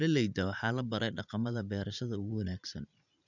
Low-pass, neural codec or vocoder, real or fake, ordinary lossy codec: 7.2 kHz; vocoder, 44.1 kHz, 80 mel bands, Vocos; fake; none